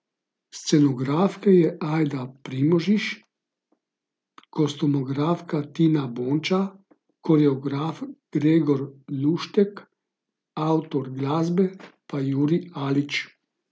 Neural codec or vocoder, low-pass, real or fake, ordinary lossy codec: none; none; real; none